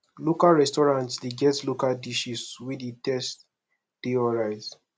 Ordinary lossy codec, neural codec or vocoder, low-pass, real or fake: none; none; none; real